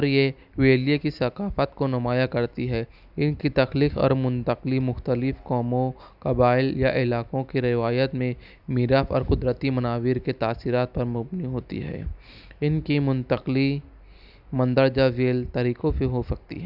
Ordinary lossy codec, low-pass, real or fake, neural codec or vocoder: none; 5.4 kHz; real; none